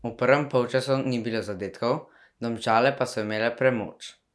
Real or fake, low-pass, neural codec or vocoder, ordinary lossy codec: real; none; none; none